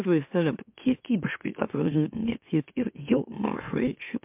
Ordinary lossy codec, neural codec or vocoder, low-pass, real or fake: MP3, 32 kbps; autoencoder, 44.1 kHz, a latent of 192 numbers a frame, MeloTTS; 3.6 kHz; fake